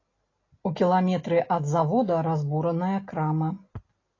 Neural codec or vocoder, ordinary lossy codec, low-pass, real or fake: none; AAC, 32 kbps; 7.2 kHz; real